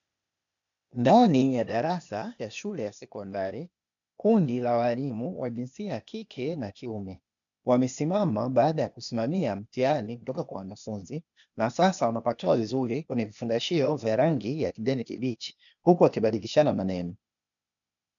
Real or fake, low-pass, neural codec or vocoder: fake; 7.2 kHz; codec, 16 kHz, 0.8 kbps, ZipCodec